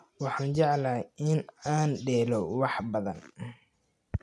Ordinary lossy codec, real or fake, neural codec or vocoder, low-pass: none; real; none; none